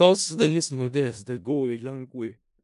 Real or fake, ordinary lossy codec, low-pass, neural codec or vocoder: fake; AAC, 96 kbps; 10.8 kHz; codec, 16 kHz in and 24 kHz out, 0.4 kbps, LongCat-Audio-Codec, four codebook decoder